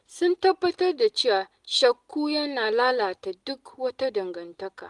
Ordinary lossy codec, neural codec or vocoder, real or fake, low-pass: Opus, 24 kbps; none; real; 10.8 kHz